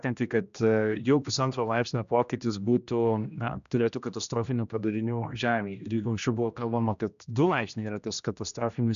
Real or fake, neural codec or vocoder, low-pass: fake; codec, 16 kHz, 1 kbps, X-Codec, HuBERT features, trained on general audio; 7.2 kHz